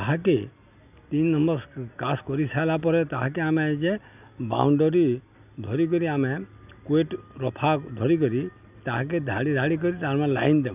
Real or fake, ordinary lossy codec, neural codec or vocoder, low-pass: real; none; none; 3.6 kHz